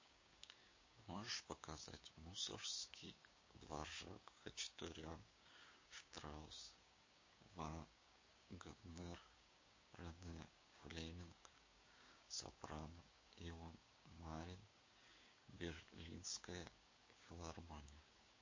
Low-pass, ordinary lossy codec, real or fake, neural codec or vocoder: 7.2 kHz; MP3, 32 kbps; fake; codec, 16 kHz, 6 kbps, DAC